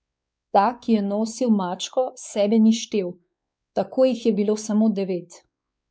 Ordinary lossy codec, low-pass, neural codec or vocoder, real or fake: none; none; codec, 16 kHz, 4 kbps, X-Codec, WavLM features, trained on Multilingual LibriSpeech; fake